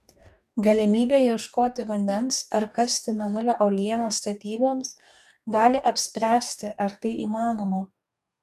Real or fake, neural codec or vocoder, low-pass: fake; codec, 44.1 kHz, 2.6 kbps, DAC; 14.4 kHz